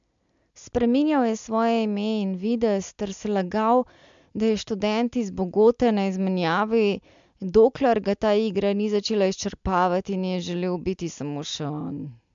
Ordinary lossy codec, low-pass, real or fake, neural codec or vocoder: MP3, 64 kbps; 7.2 kHz; real; none